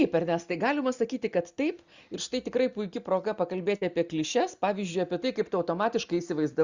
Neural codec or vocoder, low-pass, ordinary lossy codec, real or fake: none; 7.2 kHz; Opus, 64 kbps; real